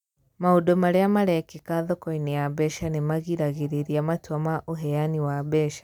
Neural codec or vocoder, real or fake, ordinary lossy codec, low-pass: none; real; none; 19.8 kHz